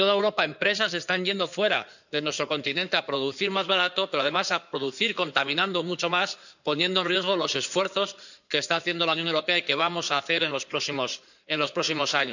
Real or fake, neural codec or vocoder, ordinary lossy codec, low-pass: fake; codec, 16 kHz in and 24 kHz out, 2.2 kbps, FireRedTTS-2 codec; none; 7.2 kHz